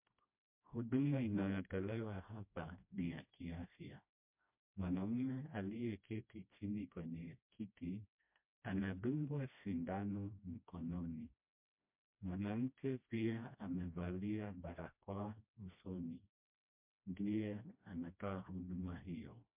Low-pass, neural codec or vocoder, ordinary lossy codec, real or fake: 3.6 kHz; codec, 16 kHz, 1 kbps, FreqCodec, smaller model; MP3, 32 kbps; fake